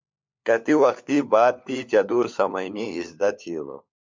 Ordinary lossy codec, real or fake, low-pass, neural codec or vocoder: MP3, 64 kbps; fake; 7.2 kHz; codec, 16 kHz, 4 kbps, FunCodec, trained on LibriTTS, 50 frames a second